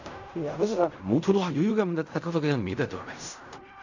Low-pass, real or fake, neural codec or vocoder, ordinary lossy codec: 7.2 kHz; fake; codec, 16 kHz in and 24 kHz out, 0.4 kbps, LongCat-Audio-Codec, fine tuned four codebook decoder; none